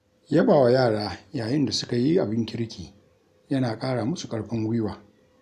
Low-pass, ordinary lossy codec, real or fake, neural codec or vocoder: 14.4 kHz; none; real; none